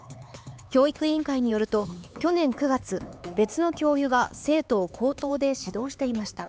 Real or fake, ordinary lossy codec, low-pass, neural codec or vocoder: fake; none; none; codec, 16 kHz, 4 kbps, X-Codec, HuBERT features, trained on LibriSpeech